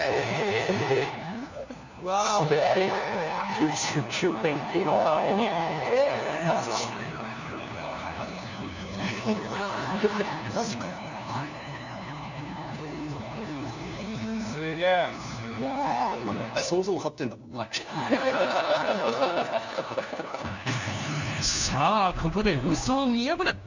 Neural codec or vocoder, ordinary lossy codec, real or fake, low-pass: codec, 16 kHz, 1 kbps, FunCodec, trained on LibriTTS, 50 frames a second; none; fake; 7.2 kHz